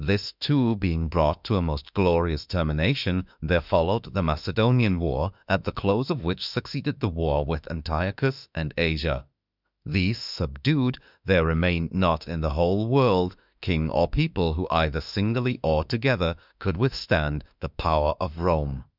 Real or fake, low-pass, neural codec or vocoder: fake; 5.4 kHz; autoencoder, 48 kHz, 32 numbers a frame, DAC-VAE, trained on Japanese speech